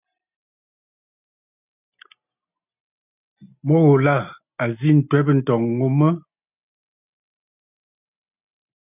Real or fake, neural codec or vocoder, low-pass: real; none; 3.6 kHz